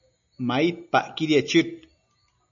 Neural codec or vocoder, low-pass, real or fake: none; 7.2 kHz; real